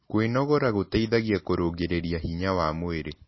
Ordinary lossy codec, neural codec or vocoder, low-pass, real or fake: MP3, 24 kbps; none; 7.2 kHz; real